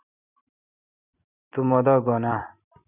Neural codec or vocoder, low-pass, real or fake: vocoder, 44.1 kHz, 128 mel bands every 256 samples, BigVGAN v2; 3.6 kHz; fake